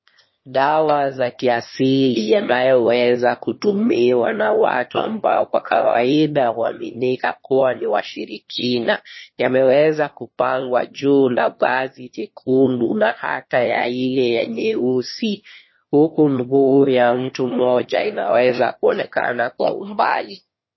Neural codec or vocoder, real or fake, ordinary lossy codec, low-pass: autoencoder, 22.05 kHz, a latent of 192 numbers a frame, VITS, trained on one speaker; fake; MP3, 24 kbps; 7.2 kHz